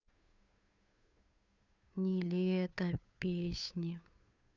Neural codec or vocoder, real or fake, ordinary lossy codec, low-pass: codec, 16 kHz, 2 kbps, FunCodec, trained on Chinese and English, 25 frames a second; fake; none; 7.2 kHz